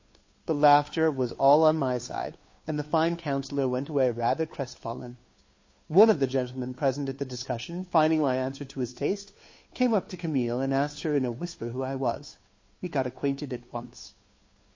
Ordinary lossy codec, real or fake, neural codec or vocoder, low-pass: MP3, 32 kbps; fake; codec, 16 kHz, 2 kbps, FunCodec, trained on Chinese and English, 25 frames a second; 7.2 kHz